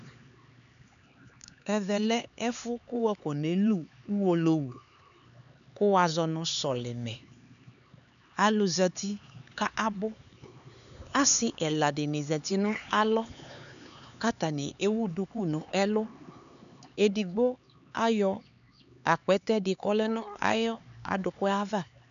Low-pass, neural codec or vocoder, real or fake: 7.2 kHz; codec, 16 kHz, 4 kbps, X-Codec, HuBERT features, trained on LibriSpeech; fake